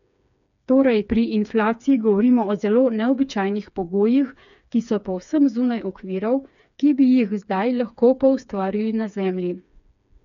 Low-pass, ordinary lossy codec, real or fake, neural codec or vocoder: 7.2 kHz; none; fake; codec, 16 kHz, 4 kbps, FreqCodec, smaller model